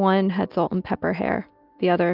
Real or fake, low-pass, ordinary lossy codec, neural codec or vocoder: real; 5.4 kHz; Opus, 32 kbps; none